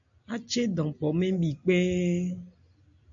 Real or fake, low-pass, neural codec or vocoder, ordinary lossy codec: real; 7.2 kHz; none; AAC, 64 kbps